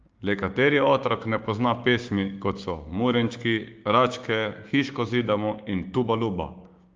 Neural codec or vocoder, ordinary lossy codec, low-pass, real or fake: codec, 16 kHz, 6 kbps, DAC; Opus, 24 kbps; 7.2 kHz; fake